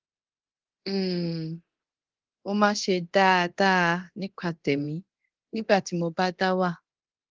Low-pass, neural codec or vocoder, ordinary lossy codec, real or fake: 7.2 kHz; codec, 24 kHz, 0.9 kbps, DualCodec; Opus, 16 kbps; fake